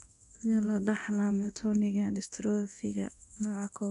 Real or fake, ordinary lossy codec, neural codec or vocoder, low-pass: fake; AAC, 96 kbps; codec, 24 kHz, 0.9 kbps, DualCodec; 10.8 kHz